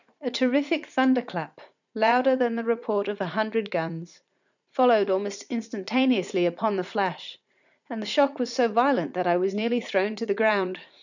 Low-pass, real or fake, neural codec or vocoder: 7.2 kHz; fake; vocoder, 44.1 kHz, 80 mel bands, Vocos